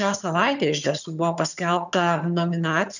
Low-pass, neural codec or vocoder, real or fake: 7.2 kHz; vocoder, 22.05 kHz, 80 mel bands, HiFi-GAN; fake